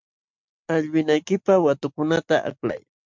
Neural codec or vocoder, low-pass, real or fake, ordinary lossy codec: none; 7.2 kHz; real; MP3, 64 kbps